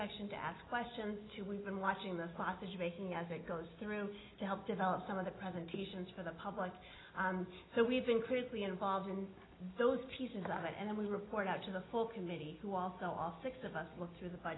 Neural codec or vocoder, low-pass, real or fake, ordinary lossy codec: none; 7.2 kHz; real; AAC, 16 kbps